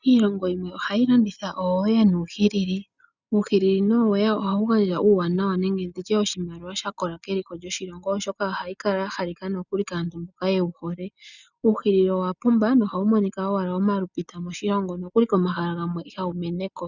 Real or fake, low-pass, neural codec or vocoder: real; 7.2 kHz; none